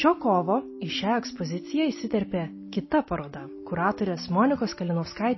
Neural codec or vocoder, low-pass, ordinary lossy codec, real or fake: none; 7.2 kHz; MP3, 24 kbps; real